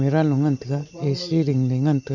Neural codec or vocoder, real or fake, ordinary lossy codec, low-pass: none; real; none; 7.2 kHz